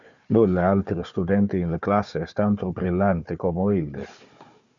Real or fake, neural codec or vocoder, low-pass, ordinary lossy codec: fake; codec, 16 kHz, 4 kbps, FunCodec, trained on Chinese and English, 50 frames a second; 7.2 kHz; Opus, 64 kbps